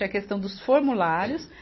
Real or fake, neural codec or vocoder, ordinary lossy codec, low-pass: real; none; MP3, 24 kbps; 7.2 kHz